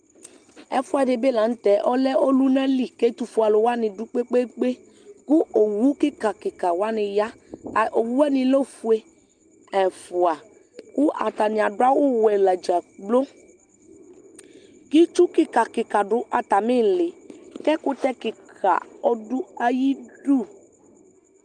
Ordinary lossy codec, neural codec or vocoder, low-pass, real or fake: Opus, 32 kbps; none; 9.9 kHz; real